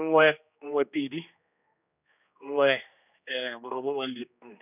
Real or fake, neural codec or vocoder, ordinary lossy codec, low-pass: fake; codec, 16 kHz, 2 kbps, X-Codec, HuBERT features, trained on general audio; none; 3.6 kHz